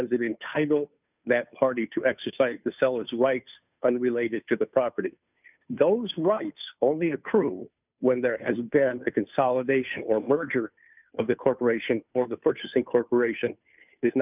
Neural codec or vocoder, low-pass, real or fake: codec, 16 kHz, 2 kbps, FunCodec, trained on Chinese and English, 25 frames a second; 3.6 kHz; fake